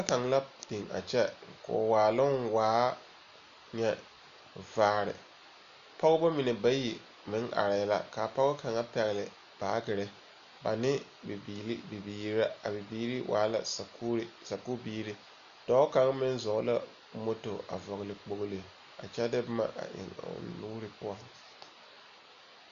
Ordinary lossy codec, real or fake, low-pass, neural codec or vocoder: MP3, 96 kbps; real; 7.2 kHz; none